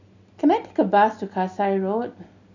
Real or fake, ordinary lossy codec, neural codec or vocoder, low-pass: real; none; none; 7.2 kHz